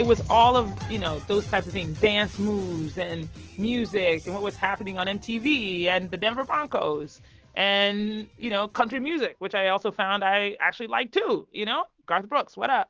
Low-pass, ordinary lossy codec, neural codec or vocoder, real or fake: 7.2 kHz; Opus, 16 kbps; autoencoder, 48 kHz, 128 numbers a frame, DAC-VAE, trained on Japanese speech; fake